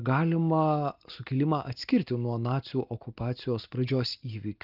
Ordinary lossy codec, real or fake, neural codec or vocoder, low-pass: Opus, 32 kbps; real; none; 5.4 kHz